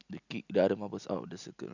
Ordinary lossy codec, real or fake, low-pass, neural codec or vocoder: none; real; 7.2 kHz; none